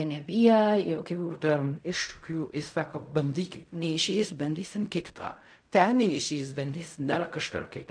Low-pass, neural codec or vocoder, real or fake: 9.9 kHz; codec, 16 kHz in and 24 kHz out, 0.4 kbps, LongCat-Audio-Codec, fine tuned four codebook decoder; fake